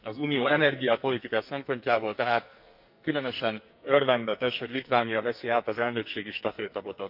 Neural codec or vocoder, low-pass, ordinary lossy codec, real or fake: codec, 44.1 kHz, 2.6 kbps, SNAC; 5.4 kHz; none; fake